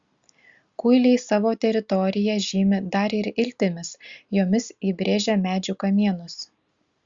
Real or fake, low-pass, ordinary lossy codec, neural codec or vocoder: real; 7.2 kHz; Opus, 64 kbps; none